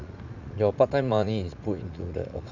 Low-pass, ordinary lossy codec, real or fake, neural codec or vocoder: 7.2 kHz; none; fake; vocoder, 44.1 kHz, 80 mel bands, Vocos